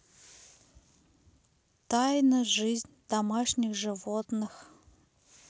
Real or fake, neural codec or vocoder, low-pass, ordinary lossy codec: real; none; none; none